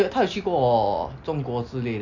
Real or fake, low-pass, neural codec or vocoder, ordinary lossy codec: real; 7.2 kHz; none; none